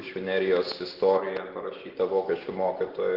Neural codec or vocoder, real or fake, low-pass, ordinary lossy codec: none; real; 5.4 kHz; Opus, 16 kbps